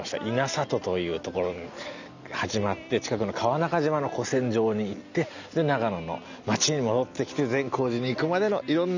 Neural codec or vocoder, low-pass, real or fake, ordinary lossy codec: none; 7.2 kHz; real; none